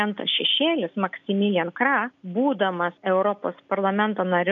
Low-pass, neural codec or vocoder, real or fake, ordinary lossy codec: 7.2 kHz; none; real; MP3, 64 kbps